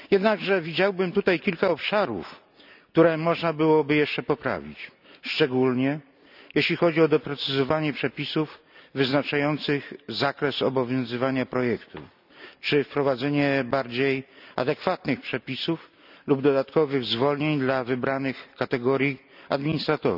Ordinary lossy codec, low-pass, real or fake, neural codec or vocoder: none; 5.4 kHz; real; none